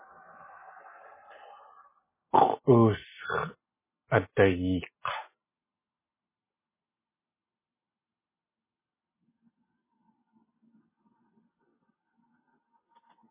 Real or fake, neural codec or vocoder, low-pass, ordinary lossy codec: real; none; 3.6 kHz; MP3, 16 kbps